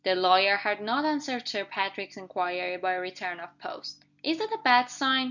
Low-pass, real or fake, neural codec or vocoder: 7.2 kHz; real; none